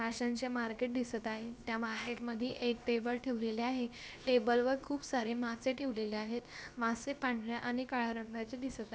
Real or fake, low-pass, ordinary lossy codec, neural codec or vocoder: fake; none; none; codec, 16 kHz, about 1 kbps, DyCAST, with the encoder's durations